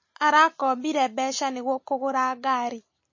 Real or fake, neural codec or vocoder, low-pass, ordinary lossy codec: real; none; 7.2 kHz; MP3, 32 kbps